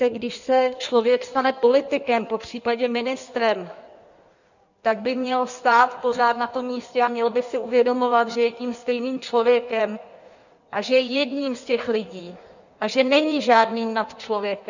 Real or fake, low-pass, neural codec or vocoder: fake; 7.2 kHz; codec, 16 kHz in and 24 kHz out, 1.1 kbps, FireRedTTS-2 codec